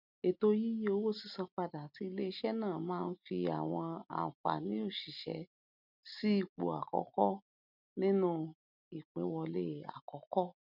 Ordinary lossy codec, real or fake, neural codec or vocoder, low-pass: none; real; none; 5.4 kHz